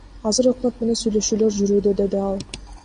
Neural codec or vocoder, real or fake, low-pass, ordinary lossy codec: none; real; 9.9 kHz; MP3, 48 kbps